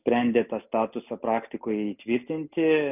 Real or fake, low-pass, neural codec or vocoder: real; 3.6 kHz; none